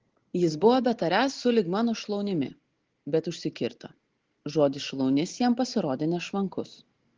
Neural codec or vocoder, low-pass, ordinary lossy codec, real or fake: none; 7.2 kHz; Opus, 16 kbps; real